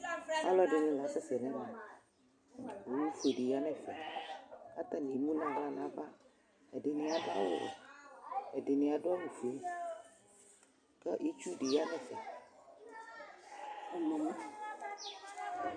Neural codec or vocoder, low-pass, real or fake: none; 9.9 kHz; real